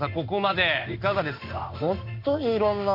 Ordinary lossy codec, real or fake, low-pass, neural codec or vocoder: AAC, 48 kbps; fake; 5.4 kHz; codec, 16 kHz in and 24 kHz out, 1 kbps, XY-Tokenizer